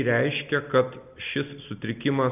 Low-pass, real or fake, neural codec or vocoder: 3.6 kHz; real; none